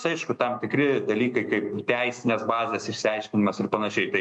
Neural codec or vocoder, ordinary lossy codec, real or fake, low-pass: autoencoder, 48 kHz, 128 numbers a frame, DAC-VAE, trained on Japanese speech; MP3, 64 kbps; fake; 10.8 kHz